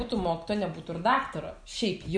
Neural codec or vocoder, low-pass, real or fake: none; 9.9 kHz; real